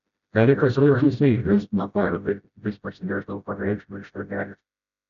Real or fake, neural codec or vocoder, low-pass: fake; codec, 16 kHz, 0.5 kbps, FreqCodec, smaller model; 7.2 kHz